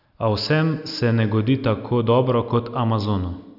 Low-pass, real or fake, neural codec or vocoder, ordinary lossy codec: 5.4 kHz; real; none; none